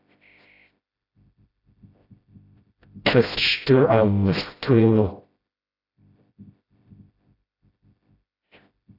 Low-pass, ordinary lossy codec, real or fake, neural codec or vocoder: 5.4 kHz; AAC, 32 kbps; fake; codec, 16 kHz, 0.5 kbps, FreqCodec, smaller model